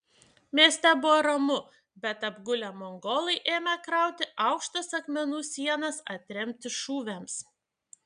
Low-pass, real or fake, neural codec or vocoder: 9.9 kHz; real; none